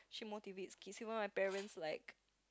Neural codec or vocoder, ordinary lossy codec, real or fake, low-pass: none; none; real; none